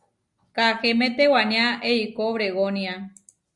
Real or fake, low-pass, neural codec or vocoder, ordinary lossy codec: real; 10.8 kHz; none; Opus, 64 kbps